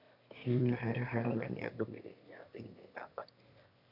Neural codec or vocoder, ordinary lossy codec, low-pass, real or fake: autoencoder, 22.05 kHz, a latent of 192 numbers a frame, VITS, trained on one speaker; none; 5.4 kHz; fake